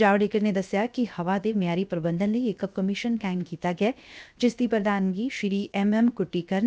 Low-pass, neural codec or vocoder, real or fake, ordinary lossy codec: none; codec, 16 kHz, 0.7 kbps, FocalCodec; fake; none